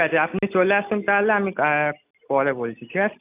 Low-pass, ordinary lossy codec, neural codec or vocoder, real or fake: 3.6 kHz; none; none; real